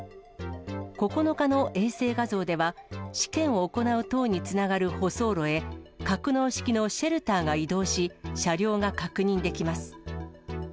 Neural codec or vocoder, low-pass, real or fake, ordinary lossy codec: none; none; real; none